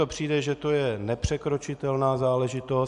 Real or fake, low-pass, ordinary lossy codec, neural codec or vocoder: real; 10.8 kHz; AAC, 96 kbps; none